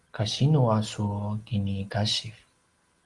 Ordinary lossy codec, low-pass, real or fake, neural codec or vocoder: Opus, 24 kbps; 10.8 kHz; real; none